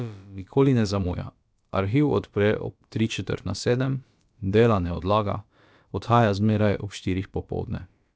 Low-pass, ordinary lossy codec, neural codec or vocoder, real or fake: none; none; codec, 16 kHz, about 1 kbps, DyCAST, with the encoder's durations; fake